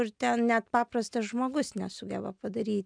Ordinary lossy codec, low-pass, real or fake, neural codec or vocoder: MP3, 96 kbps; 9.9 kHz; real; none